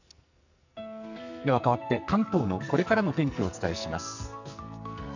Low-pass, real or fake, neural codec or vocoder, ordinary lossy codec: 7.2 kHz; fake; codec, 44.1 kHz, 2.6 kbps, SNAC; none